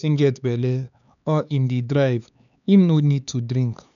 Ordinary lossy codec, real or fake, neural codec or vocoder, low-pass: none; fake; codec, 16 kHz, 4 kbps, X-Codec, HuBERT features, trained on LibriSpeech; 7.2 kHz